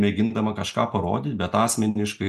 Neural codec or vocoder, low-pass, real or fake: none; 14.4 kHz; real